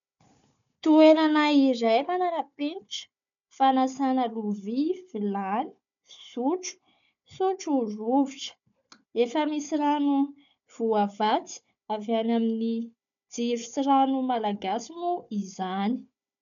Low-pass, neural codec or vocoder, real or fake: 7.2 kHz; codec, 16 kHz, 4 kbps, FunCodec, trained on Chinese and English, 50 frames a second; fake